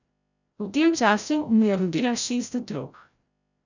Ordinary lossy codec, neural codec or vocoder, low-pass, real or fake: none; codec, 16 kHz, 0.5 kbps, FreqCodec, larger model; 7.2 kHz; fake